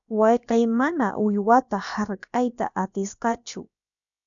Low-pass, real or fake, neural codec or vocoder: 7.2 kHz; fake; codec, 16 kHz, about 1 kbps, DyCAST, with the encoder's durations